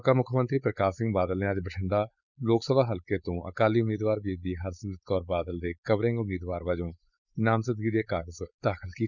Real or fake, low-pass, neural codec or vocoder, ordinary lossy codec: fake; 7.2 kHz; codec, 16 kHz, 4.8 kbps, FACodec; none